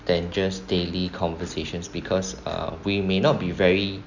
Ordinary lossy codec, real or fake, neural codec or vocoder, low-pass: none; real; none; 7.2 kHz